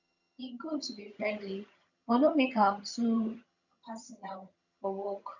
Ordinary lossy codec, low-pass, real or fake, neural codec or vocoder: none; 7.2 kHz; fake; vocoder, 22.05 kHz, 80 mel bands, HiFi-GAN